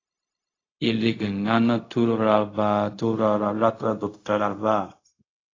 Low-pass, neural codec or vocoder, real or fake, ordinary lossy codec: 7.2 kHz; codec, 16 kHz, 0.4 kbps, LongCat-Audio-Codec; fake; AAC, 32 kbps